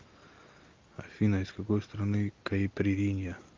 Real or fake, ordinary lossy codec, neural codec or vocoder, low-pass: real; Opus, 24 kbps; none; 7.2 kHz